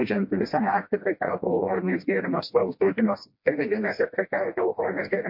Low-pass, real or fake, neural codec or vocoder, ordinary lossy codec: 5.4 kHz; fake; codec, 16 kHz, 1 kbps, FreqCodec, smaller model; MP3, 32 kbps